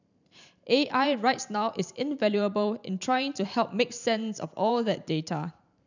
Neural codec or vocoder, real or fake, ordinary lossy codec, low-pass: vocoder, 44.1 kHz, 128 mel bands every 512 samples, BigVGAN v2; fake; none; 7.2 kHz